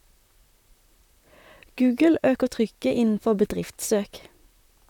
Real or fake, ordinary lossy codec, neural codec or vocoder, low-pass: fake; none; vocoder, 44.1 kHz, 128 mel bands, Pupu-Vocoder; 19.8 kHz